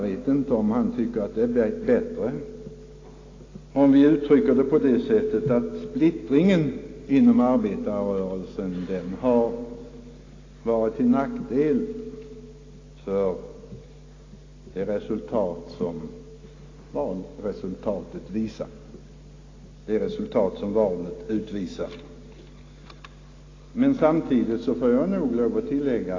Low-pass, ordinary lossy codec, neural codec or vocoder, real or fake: 7.2 kHz; AAC, 32 kbps; none; real